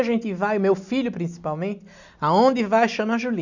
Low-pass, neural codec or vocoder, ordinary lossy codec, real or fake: 7.2 kHz; none; none; real